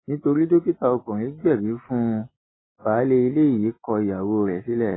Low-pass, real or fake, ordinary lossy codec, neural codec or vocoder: 7.2 kHz; real; AAC, 16 kbps; none